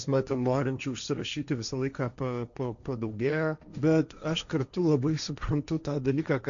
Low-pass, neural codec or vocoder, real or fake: 7.2 kHz; codec, 16 kHz, 1.1 kbps, Voila-Tokenizer; fake